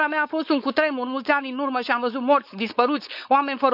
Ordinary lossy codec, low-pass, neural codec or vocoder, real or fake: none; 5.4 kHz; codec, 16 kHz, 4.8 kbps, FACodec; fake